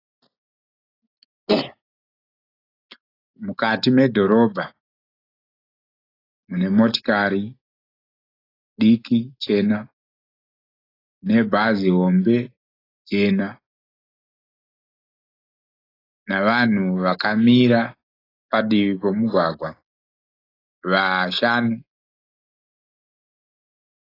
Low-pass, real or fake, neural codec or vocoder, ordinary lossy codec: 5.4 kHz; real; none; AAC, 24 kbps